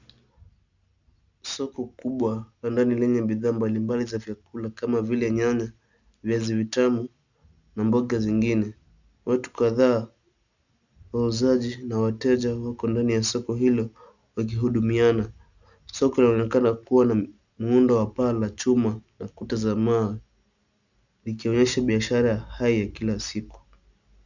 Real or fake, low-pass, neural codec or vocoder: real; 7.2 kHz; none